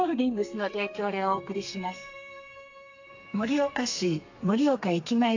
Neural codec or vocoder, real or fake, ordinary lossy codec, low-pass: codec, 32 kHz, 1.9 kbps, SNAC; fake; AAC, 48 kbps; 7.2 kHz